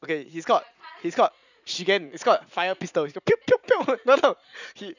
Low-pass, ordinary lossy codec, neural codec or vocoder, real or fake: 7.2 kHz; none; vocoder, 44.1 kHz, 80 mel bands, Vocos; fake